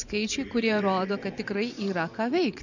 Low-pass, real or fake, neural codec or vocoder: 7.2 kHz; fake; vocoder, 24 kHz, 100 mel bands, Vocos